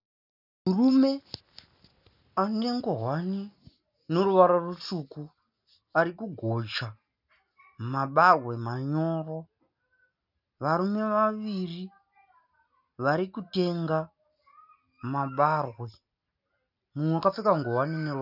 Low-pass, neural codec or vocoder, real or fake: 5.4 kHz; none; real